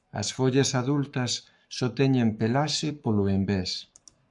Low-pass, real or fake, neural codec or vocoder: 10.8 kHz; fake; codec, 44.1 kHz, 7.8 kbps, Pupu-Codec